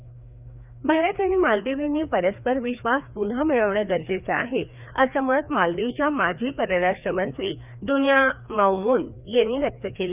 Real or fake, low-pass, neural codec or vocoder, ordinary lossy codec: fake; 3.6 kHz; codec, 16 kHz, 2 kbps, FreqCodec, larger model; none